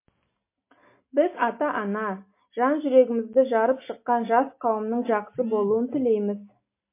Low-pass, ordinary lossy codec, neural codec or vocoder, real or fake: 3.6 kHz; MP3, 24 kbps; none; real